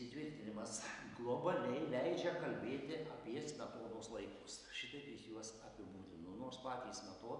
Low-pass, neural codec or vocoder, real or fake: 10.8 kHz; none; real